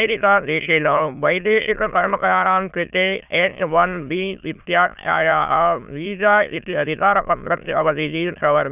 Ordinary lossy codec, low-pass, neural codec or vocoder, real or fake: none; 3.6 kHz; autoencoder, 22.05 kHz, a latent of 192 numbers a frame, VITS, trained on many speakers; fake